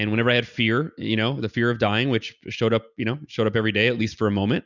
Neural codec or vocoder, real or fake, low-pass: none; real; 7.2 kHz